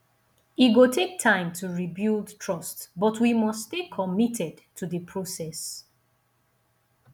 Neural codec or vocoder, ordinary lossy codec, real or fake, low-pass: none; none; real; 19.8 kHz